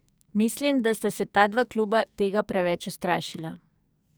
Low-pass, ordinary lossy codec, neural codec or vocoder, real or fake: none; none; codec, 44.1 kHz, 2.6 kbps, SNAC; fake